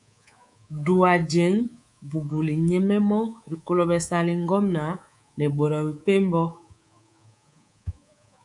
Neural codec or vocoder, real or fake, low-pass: codec, 24 kHz, 3.1 kbps, DualCodec; fake; 10.8 kHz